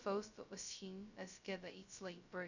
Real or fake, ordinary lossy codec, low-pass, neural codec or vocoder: fake; none; 7.2 kHz; codec, 16 kHz, 0.2 kbps, FocalCodec